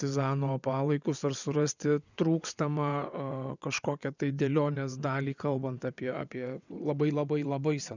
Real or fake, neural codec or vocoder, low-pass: fake; vocoder, 22.05 kHz, 80 mel bands, WaveNeXt; 7.2 kHz